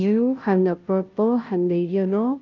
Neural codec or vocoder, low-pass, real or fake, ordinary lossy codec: codec, 16 kHz, 0.5 kbps, FunCodec, trained on Chinese and English, 25 frames a second; 7.2 kHz; fake; Opus, 24 kbps